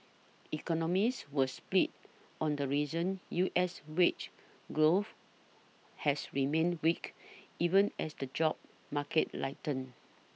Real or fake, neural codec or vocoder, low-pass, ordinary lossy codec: real; none; none; none